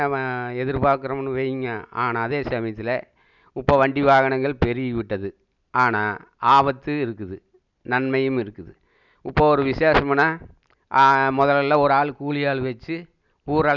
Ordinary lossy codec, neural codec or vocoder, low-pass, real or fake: none; none; 7.2 kHz; real